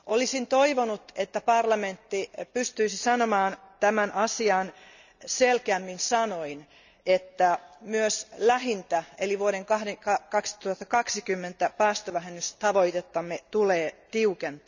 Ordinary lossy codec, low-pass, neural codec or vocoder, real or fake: none; 7.2 kHz; none; real